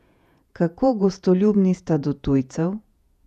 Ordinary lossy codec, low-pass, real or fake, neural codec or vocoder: none; 14.4 kHz; fake; vocoder, 48 kHz, 128 mel bands, Vocos